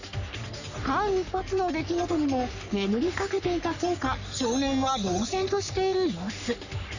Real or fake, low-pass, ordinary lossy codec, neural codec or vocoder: fake; 7.2 kHz; none; codec, 44.1 kHz, 3.4 kbps, Pupu-Codec